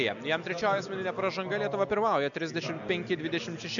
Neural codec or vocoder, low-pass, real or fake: none; 7.2 kHz; real